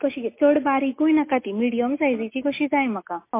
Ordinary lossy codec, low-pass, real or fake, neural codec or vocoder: MP3, 24 kbps; 3.6 kHz; real; none